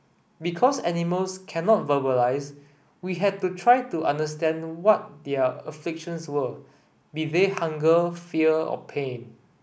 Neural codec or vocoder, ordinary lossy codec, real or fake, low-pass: none; none; real; none